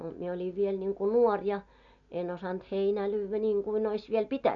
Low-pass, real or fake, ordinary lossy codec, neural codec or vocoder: 7.2 kHz; real; none; none